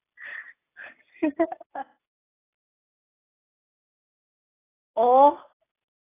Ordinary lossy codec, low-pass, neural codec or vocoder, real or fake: AAC, 16 kbps; 3.6 kHz; none; real